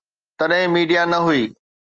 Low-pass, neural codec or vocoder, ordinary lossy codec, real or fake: 7.2 kHz; none; Opus, 24 kbps; real